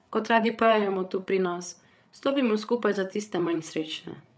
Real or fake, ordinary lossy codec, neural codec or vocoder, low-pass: fake; none; codec, 16 kHz, 8 kbps, FreqCodec, larger model; none